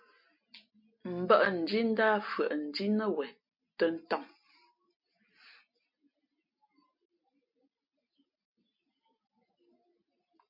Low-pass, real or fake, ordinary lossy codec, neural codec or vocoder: 5.4 kHz; real; MP3, 32 kbps; none